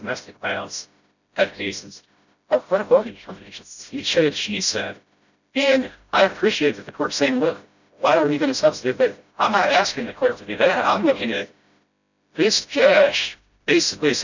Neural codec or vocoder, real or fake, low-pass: codec, 16 kHz, 0.5 kbps, FreqCodec, smaller model; fake; 7.2 kHz